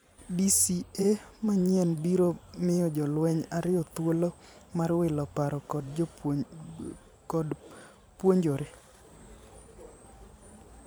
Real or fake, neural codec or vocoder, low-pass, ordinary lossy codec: fake; vocoder, 44.1 kHz, 128 mel bands every 512 samples, BigVGAN v2; none; none